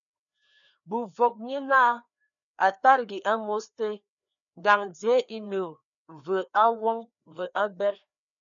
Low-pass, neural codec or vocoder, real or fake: 7.2 kHz; codec, 16 kHz, 2 kbps, FreqCodec, larger model; fake